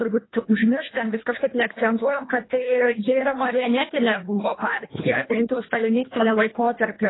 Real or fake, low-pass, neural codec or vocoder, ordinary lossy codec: fake; 7.2 kHz; codec, 24 kHz, 1.5 kbps, HILCodec; AAC, 16 kbps